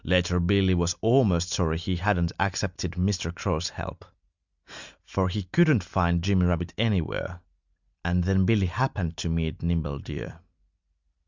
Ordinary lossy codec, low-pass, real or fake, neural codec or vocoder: Opus, 64 kbps; 7.2 kHz; real; none